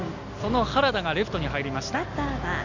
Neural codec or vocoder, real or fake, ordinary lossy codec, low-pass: none; real; none; 7.2 kHz